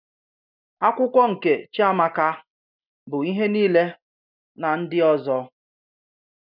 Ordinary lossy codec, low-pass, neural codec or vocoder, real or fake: none; 5.4 kHz; none; real